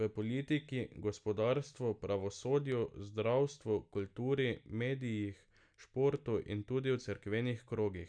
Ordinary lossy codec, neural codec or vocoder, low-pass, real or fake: none; none; 9.9 kHz; real